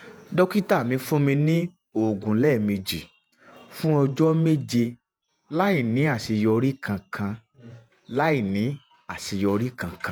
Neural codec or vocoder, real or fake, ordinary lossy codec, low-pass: vocoder, 48 kHz, 128 mel bands, Vocos; fake; none; none